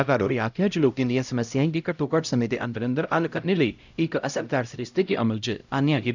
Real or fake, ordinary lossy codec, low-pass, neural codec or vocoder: fake; none; 7.2 kHz; codec, 16 kHz, 0.5 kbps, X-Codec, HuBERT features, trained on LibriSpeech